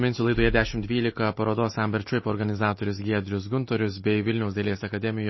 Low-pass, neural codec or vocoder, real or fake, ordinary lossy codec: 7.2 kHz; none; real; MP3, 24 kbps